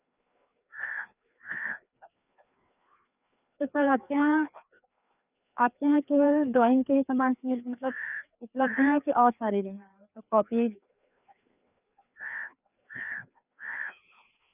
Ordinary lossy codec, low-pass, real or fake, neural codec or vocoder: none; 3.6 kHz; fake; codec, 16 kHz, 2 kbps, FreqCodec, larger model